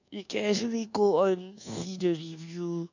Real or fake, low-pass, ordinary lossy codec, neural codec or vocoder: fake; 7.2 kHz; none; codec, 24 kHz, 1.2 kbps, DualCodec